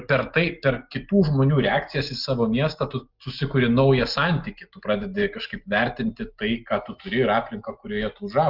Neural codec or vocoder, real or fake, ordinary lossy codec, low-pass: none; real; Opus, 24 kbps; 5.4 kHz